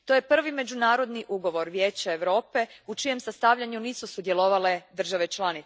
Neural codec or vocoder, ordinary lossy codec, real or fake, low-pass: none; none; real; none